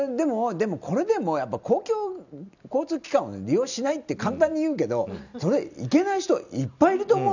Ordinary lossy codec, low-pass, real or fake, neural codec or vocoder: none; 7.2 kHz; real; none